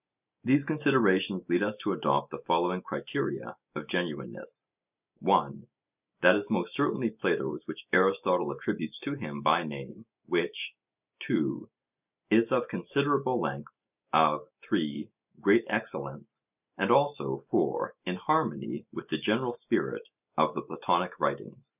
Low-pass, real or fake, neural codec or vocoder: 3.6 kHz; real; none